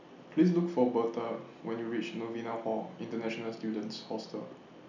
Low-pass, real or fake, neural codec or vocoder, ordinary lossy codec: 7.2 kHz; real; none; none